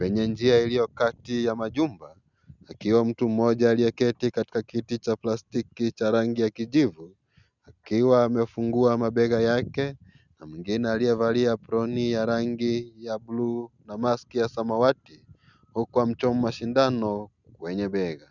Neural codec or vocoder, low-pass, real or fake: none; 7.2 kHz; real